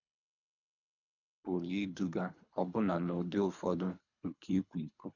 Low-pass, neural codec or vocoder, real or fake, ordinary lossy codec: 7.2 kHz; codec, 24 kHz, 3 kbps, HILCodec; fake; none